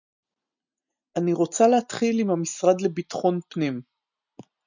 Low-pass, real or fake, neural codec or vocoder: 7.2 kHz; real; none